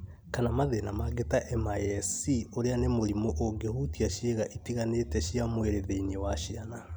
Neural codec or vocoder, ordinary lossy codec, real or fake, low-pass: none; none; real; none